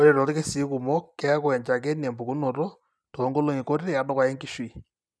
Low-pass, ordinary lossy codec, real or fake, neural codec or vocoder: none; none; real; none